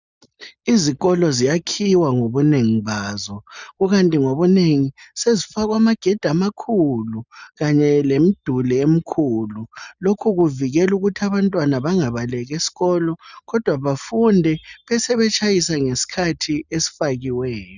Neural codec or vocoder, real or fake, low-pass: none; real; 7.2 kHz